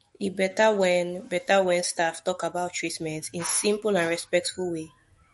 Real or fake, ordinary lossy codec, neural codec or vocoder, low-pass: fake; MP3, 48 kbps; autoencoder, 48 kHz, 128 numbers a frame, DAC-VAE, trained on Japanese speech; 19.8 kHz